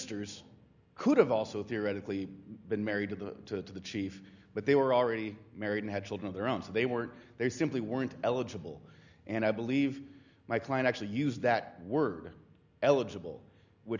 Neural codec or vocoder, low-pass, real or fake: none; 7.2 kHz; real